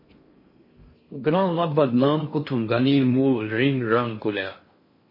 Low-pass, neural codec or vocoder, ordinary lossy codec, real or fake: 5.4 kHz; codec, 16 kHz in and 24 kHz out, 0.6 kbps, FocalCodec, streaming, 4096 codes; MP3, 24 kbps; fake